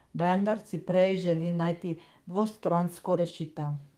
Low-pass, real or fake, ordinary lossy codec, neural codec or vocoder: 14.4 kHz; fake; Opus, 32 kbps; codec, 32 kHz, 1.9 kbps, SNAC